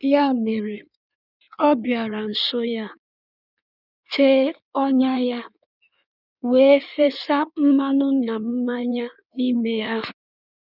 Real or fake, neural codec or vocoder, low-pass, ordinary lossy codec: fake; codec, 16 kHz in and 24 kHz out, 1.1 kbps, FireRedTTS-2 codec; 5.4 kHz; none